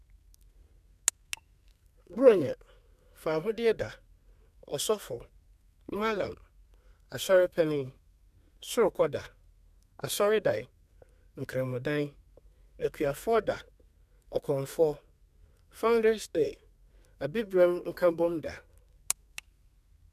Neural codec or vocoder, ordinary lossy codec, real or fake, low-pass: codec, 32 kHz, 1.9 kbps, SNAC; none; fake; 14.4 kHz